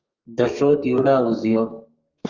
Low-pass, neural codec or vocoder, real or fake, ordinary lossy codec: 7.2 kHz; codec, 32 kHz, 1.9 kbps, SNAC; fake; Opus, 32 kbps